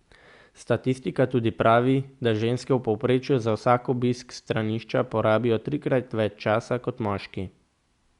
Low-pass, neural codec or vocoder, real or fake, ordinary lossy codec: 10.8 kHz; none; real; Opus, 64 kbps